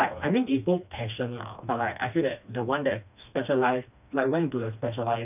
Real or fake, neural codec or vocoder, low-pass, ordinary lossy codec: fake; codec, 16 kHz, 2 kbps, FreqCodec, smaller model; 3.6 kHz; none